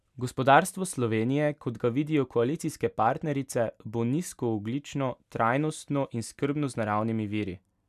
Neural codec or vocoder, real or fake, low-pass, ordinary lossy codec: none; real; 14.4 kHz; none